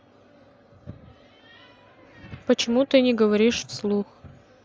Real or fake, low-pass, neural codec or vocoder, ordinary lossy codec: real; none; none; none